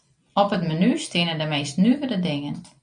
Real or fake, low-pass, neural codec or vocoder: real; 9.9 kHz; none